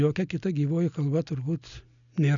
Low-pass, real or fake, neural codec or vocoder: 7.2 kHz; real; none